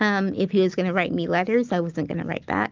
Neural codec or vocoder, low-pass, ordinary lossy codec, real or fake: none; 7.2 kHz; Opus, 32 kbps; real